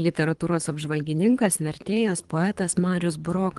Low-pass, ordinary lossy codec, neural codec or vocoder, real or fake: 10.8 kHz; Opus, 24 kbps; codec, 24 kHz, 3 kbps, HILCodec; fake